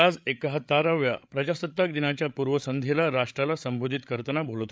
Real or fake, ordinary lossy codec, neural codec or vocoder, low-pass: fake; none; codec, 16 kHz, 8 kbps, FreqCodec, larger model; none